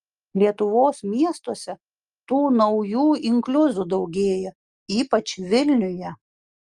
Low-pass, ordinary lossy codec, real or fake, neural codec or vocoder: 10.8 kHz; Opus, 32 kbps; real; none